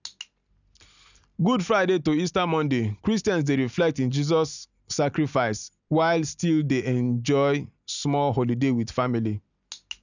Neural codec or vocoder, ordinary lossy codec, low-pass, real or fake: none; none; 7.2 kHz; real